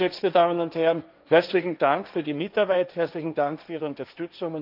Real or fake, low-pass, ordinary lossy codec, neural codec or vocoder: fake; 5.4 kHz; none; codec, 16 kHz, 1.1 kbps, Voila-Tokenizer